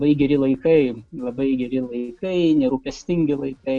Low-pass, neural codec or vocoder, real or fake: 10.8 kHz; none; real